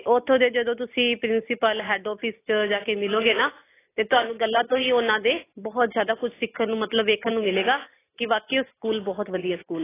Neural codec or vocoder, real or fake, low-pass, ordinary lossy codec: none; real; 3.6 kHz; AAC, 16 kbps